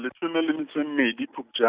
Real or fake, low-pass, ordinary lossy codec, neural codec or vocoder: real; 3.6 kHz; Opus, 64 kbps; none